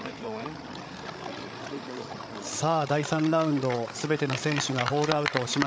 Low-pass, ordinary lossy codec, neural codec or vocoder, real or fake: none; none; codec, 16 kHz, 16 kbps, FreqCodec, larger model; fake